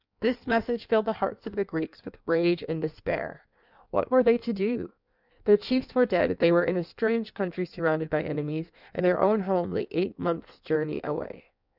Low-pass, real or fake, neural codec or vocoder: 5.4 kHz; fake; codec, 16 kHz in and 24 kHz out, 1.1 kbps, FireRedTTS-2 codec